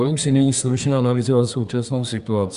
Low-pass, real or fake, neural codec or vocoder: 10.8 kHz; fake; codec, 24 kHz, 1 kbps, SNAC